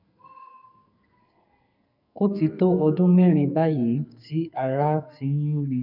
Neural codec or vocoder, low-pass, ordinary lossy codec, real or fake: codec, 44.1 kHz, 2.6 kbps, SNAC; 5.4 kHz; none; fake